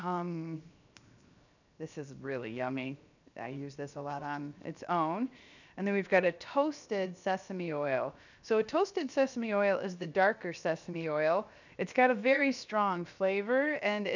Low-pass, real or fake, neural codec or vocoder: 7.2 kHz; fake; codec, 16 kHz, 0.7 kbps, FocalCodec